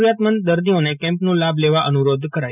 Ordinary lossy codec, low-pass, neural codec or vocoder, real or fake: none; 3.6 kHz; none; real